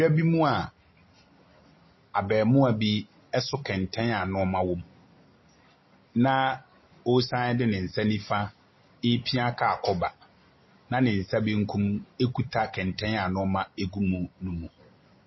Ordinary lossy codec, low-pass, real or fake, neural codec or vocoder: MP3, 24 kbps; 7.2 kHz; real; none